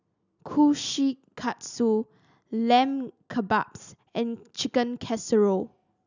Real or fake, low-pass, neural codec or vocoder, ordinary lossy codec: real; 7.2 kHz; none; none